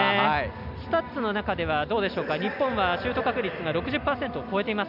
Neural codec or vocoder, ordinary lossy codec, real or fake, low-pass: none; none; real; 5.4 kHz